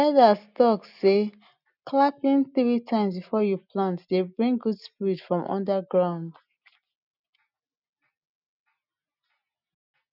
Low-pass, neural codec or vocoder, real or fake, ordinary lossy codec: 5.4 kHz; none; real; none